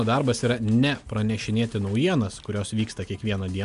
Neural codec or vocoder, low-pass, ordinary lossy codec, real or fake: none; 10.8 kHz; MP3, 96 kbps; real